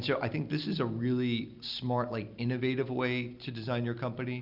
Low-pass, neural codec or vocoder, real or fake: 5.4 kHz; none; real